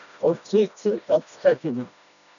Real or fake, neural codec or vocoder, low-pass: fake; codec, 16 kHz, 1 kbps, FreqCodec, smaller model; 7.2 kHz